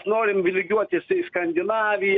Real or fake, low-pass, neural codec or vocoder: real; 7.2 kHz; none